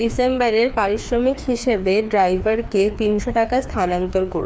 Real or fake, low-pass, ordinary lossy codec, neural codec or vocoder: fake; none; none; codec, 16 kHz, 2 kbps, FreqCodec, larger model